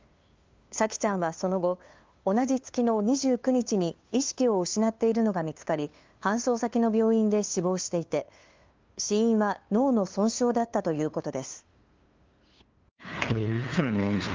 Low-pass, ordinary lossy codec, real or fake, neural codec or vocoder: 7.2 kHz; Opus, 32 kbps; fake; codec, 16 kHz, 2 kbps, FunCodec, trained on LibriTTS, 25 frames a second